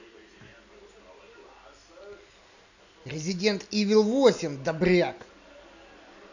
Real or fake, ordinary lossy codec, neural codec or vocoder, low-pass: real; none; none; 7.2 kHz